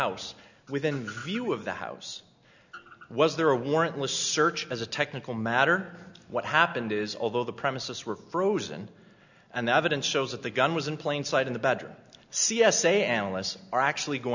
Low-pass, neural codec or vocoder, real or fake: 7.2 kHz; none; real